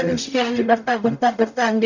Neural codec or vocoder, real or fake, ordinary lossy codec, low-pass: codec, 44.1 kHz, 0.9 kbps, DAC; fake; none; 7.2 kHz